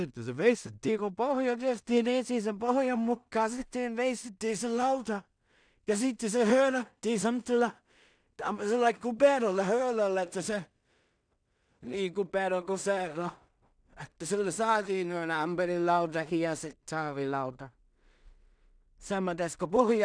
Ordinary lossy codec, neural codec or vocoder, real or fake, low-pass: none; codec, 16 kHz in and 24 kHz out, 0.4 kbps, LongCat-Audio-Codec, two codebook decoder; fake; 9.9 kHz